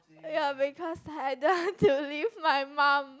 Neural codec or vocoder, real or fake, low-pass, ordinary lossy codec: none; real; none; none